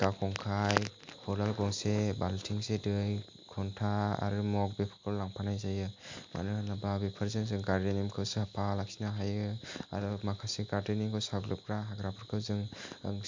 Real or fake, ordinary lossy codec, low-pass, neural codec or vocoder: real; MP3, 48 kbps; 7.2 kHz; none